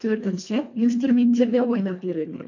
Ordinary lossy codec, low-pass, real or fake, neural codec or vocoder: MP3, 48 kbps; 7.2 kHz; fake; codec, 24 kHz, 1.5 kbps, HILCodec